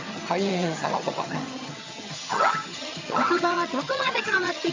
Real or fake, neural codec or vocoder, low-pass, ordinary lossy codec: fake; vocoder, 22.05 kHz, 80 mel bands, HiFi-GAN; 7.2 kHz; MP3, 48 kbps